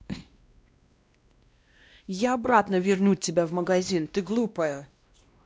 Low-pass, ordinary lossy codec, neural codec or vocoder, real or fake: none; none; codec, 16 kHz, 1 kbps, X-Codec, WavLM features, trained on Multilingual LibriSpeech; fake